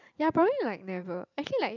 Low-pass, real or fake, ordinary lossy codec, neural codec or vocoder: 7.2 kHz; fake; none; vocoder, 44.1 kHz, 128 mel bands every 512 samples, BigVGAN v2